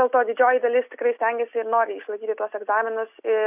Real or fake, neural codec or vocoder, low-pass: real; none; 3.6 kHz